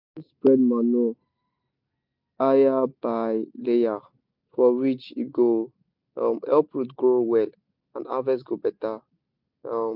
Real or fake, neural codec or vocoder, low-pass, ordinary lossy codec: real; none; 5.4 kHz; none